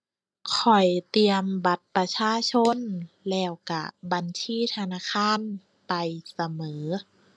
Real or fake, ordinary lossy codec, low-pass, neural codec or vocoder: real; none; none; none